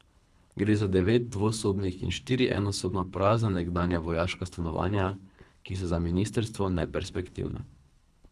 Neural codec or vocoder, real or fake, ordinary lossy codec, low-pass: codec, 24 kHz, 3 kbps, HILCodec; fake; none; none